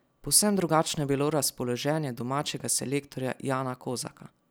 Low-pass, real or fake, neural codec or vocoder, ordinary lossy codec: none; real; none; none